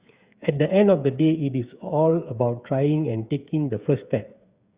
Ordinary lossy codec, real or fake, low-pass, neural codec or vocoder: Opus, 64 kbps; fake; 3.6 kHz; codec, 16 kHz, 8 kbps, FreqCodec, smaller model